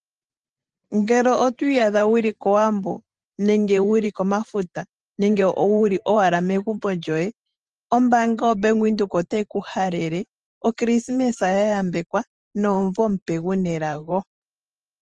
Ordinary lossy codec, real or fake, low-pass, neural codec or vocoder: Opus, 24 kbps; fake; 10.8 kHz; vocoder, 44.1 kHz, 128 mel bands every 512 samples, BigVGAN v2